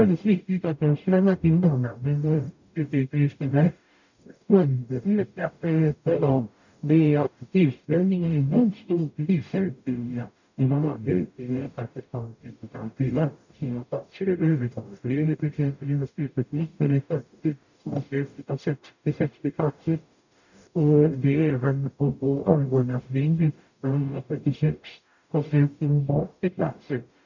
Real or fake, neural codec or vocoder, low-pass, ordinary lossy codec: fake; codec, 44.1 kHz, 0.9 kbps, DAC; 7.2 kHz; none